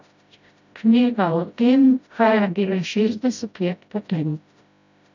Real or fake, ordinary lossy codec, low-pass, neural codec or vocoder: fake; none; 7.2 kHz; codec, 16 kHz, 0.5 kbps, FreqCodec, smaller model